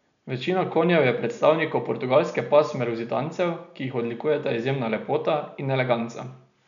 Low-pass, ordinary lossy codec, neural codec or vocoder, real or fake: 7.2 kHz; none; none; real